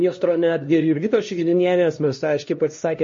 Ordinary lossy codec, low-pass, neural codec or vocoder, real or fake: MP3, 32 kbps; 7.2 kHz; codec, 16 kHz, 1 kbps, X-Codec, HuBERT features, trained on LibriSpeech; fake